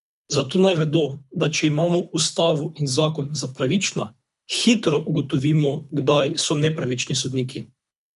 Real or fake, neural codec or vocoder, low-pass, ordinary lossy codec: fake; codec, 24 kHz, 3 kbps, HILCodec; 10.8 kHz; none